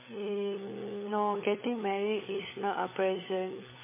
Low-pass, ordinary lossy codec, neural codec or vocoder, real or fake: 3.6 kHz; MP3, 16 kbps; codec, 16 kHz, 16 kbps, FunCodec, trained on LibriTTS, 50 frames a second; fake